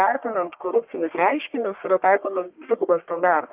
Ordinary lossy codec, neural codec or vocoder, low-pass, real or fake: Opus, 24 kbps; codec, 44.1 kHz, 1.7 kbps, Pupu-Codec; 3.6 kHz; fake